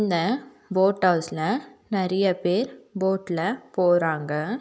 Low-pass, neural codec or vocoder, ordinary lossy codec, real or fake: none; none; none; real